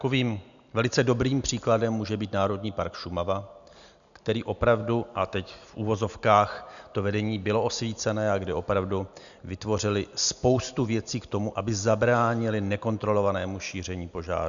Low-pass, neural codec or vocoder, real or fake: 7.2 kHz; none; real